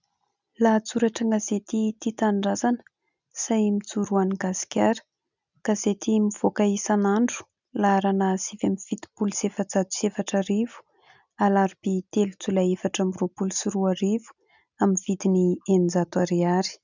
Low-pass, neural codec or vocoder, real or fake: 7.2 kHz; none; real